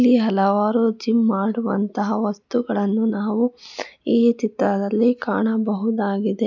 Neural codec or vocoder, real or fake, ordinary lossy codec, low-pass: none; real; none; 7.2 kHz